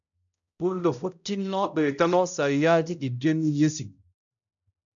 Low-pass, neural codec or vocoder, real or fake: 7.2 kHz; codec, 16 kHz, 0.5 kbps, X-Codec, HuBERT features, trained on balanced general audio; fake